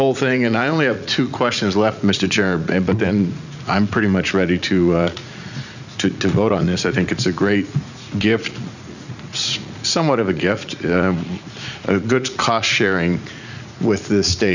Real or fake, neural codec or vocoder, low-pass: fake; vocoder, 44.1 kHz, 80 mel bands, Vocos; 7.2 kHz